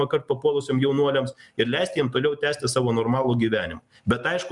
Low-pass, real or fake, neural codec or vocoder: 10.8 kHz; real; none